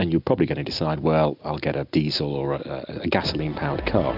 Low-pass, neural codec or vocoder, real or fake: 5.4 kHz; none; real